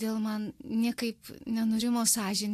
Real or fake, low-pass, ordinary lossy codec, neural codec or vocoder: real; 14.4 kHz; AAC, 64 kbps; none